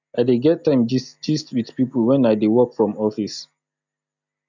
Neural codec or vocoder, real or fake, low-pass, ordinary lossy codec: none; real; 7.2 kHz; none